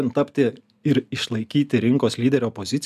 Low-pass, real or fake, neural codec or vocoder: 14.4 kHz; real; none